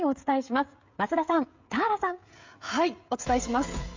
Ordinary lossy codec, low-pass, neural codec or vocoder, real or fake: MP3, 48 kbps; 7.2 kHz; codec, 16 kHz, 16 kbps, FreqCodec, smaller model; fake